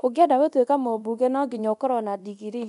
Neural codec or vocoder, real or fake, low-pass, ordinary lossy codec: codec, 24 kHz, 0.9 kbps, DualCodec; fake; 10.8 kHz; none